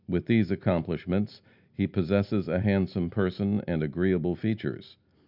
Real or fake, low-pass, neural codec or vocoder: real; 5.4 kHz; none